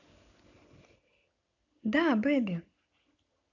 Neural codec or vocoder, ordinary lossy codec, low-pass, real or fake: none; none; 7.2 kHz; real